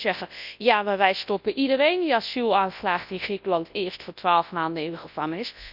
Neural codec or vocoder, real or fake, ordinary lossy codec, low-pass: codec, 24 kHz, 0.9 kbps, WavTokenizer, large speech release; fake; none; 5.4 kHz